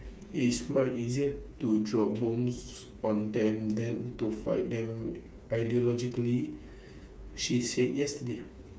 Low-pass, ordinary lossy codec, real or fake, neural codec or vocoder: none; none; fake; codec, 16 kHz, 4 kbps, FreqCodec, smaller model